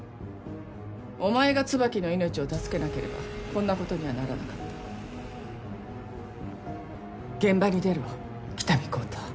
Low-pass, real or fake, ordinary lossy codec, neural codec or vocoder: none; real; none; none